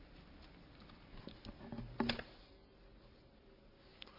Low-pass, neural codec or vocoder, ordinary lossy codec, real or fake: 5.4 kHz; none; AAC, 48 kbps; real